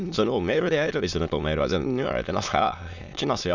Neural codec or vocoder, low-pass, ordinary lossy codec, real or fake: autoencoder, 22.05 kHz, a latent of 192 numbers a frame, VITS, trained on many speakers; 7.2 kHz; none; fake